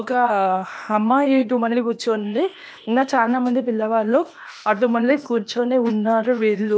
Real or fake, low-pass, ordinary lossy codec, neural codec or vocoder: fake; none; none; codec, 16 kHz, 0.8 kbps, ZipCodec